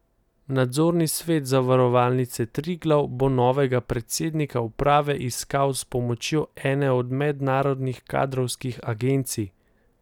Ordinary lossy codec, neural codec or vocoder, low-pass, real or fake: none; none; 19.8 kHz; real